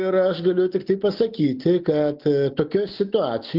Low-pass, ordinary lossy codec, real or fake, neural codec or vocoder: 5.4 kHz; Opus, 32 kbps; real; none